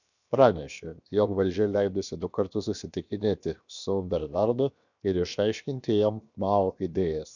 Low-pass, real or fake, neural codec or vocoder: 7.2 kHz; fake; codec, 16 kHz, 0.7 kbps, FocalCodec